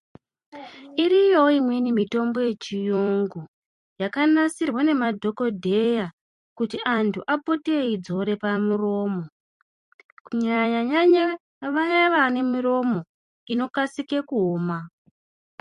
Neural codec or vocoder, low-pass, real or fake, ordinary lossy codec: vocoder, 44.1 kHz, 128 mel bands every 256 samples, BigVGAN v2; 14.4 kHz; fake; MP3, 48 kbps